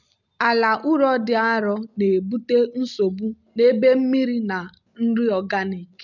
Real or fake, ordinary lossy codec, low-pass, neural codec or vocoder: real; none; 7.2 kHz; none